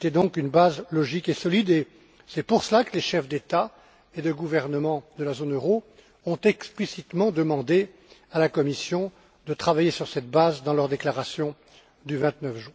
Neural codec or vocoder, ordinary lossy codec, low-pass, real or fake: none; none; none; real